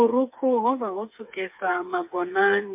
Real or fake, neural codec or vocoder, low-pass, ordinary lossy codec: fake; codec, 16 kHz, 4 kbps, FreqCodec, smaller model; 3.6 kHz; MP3, 32 kbps